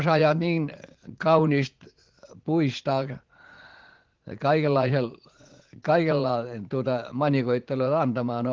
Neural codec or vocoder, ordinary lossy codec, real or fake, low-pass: vocoder, 44.1 kHz, 80 mel bands, Vocos; Opus, 16 kbps; fake; 7.2 kHz